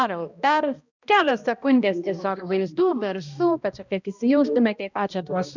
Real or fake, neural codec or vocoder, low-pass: fake; codec, 16 kHz, 1 kbps, X-Codec, HuBERT features, trained on balanced general audio; 7.2 kHz